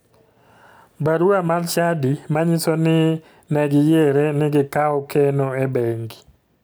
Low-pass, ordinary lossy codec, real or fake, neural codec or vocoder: none; none; real; none